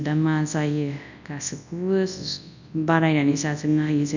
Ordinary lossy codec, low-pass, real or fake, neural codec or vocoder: none; 7.2 kHz; fake; codec, 24 kHz, 0.9 kbps, WavTokenizer, large speech release